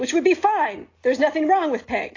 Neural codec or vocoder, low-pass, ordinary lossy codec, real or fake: none; 7.2 kHz; AAC, 32 kbps; real